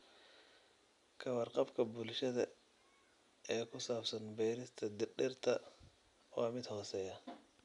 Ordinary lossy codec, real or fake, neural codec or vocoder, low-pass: none; real; none; 10.8 kHz